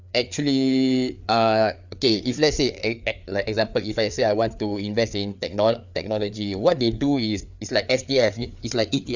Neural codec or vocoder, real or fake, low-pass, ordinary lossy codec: codec, 16 kHz, 4 kbps, FreqCodec, larger model; fake; 7.2 kHz; none